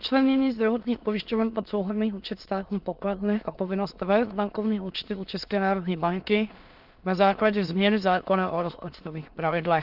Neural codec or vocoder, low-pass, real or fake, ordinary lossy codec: autoencoder, 22.05 kHz, a latent of 192 numbers a frame, VITS, trained on many speakers; 5.4 kHz; fake; Opus, 24 kbps